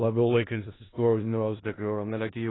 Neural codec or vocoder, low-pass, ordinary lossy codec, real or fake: codec, 16 kHz in and 24 kHz out, 0.4 kbps, LongCat-Audio-Codec, four codebook decoder; 7.2 kHz; AAC, 16 kbps; fake